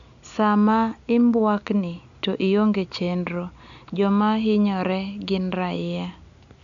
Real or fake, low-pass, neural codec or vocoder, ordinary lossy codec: real; 7.2 kHz; none; none